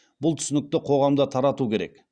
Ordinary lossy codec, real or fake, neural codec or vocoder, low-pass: Opus, 64 kbps; real; none; 9.9 kHz